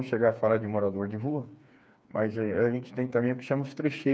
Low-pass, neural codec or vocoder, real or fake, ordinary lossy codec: none; codec, 16 kHz, 4 kbps, FreqCodec, smaller model; fake; none